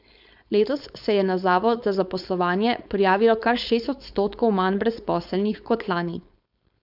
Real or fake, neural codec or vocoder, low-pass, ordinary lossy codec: fake; codec, 16 kHz, 4.8 kbps, FACodec; 5.4 kHz; none